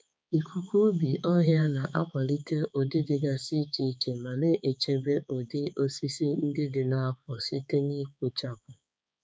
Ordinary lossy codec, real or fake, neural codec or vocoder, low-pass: none; fake; codec, 16 kHz, 4 kbps, X-Codec, HuBERT features, trained on balanced general audio; none